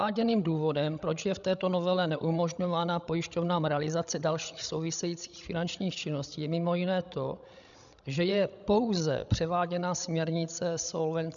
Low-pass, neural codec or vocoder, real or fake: 7.2 kHz; codec, 16 kHz, 16 kbps, FreqCodec, larger model; fake